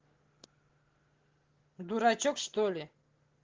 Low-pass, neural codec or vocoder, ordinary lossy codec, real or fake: 7.2 kHz; none; Opus, 16 kbps; real